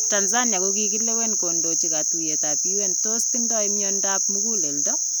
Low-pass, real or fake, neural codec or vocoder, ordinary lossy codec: none; real; none; none